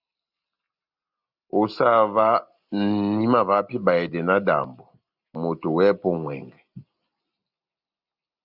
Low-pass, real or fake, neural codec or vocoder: 5.4 kHz; real; none